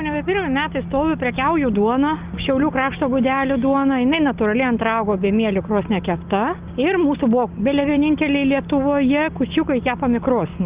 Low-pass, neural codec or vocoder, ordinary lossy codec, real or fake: 3.6 kHz; none; Opus, 32 kbps; real